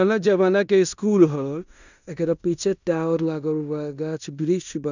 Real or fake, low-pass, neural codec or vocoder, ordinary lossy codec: fake; 7.2 kHz; codec, 16 kHz in and 24 kHz out, 0.9 kbps, LongCat-Audio-Codec, fine tuned four codebook decoder; none